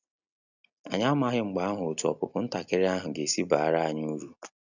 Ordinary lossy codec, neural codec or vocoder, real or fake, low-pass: none; none; real; 7.2 kHz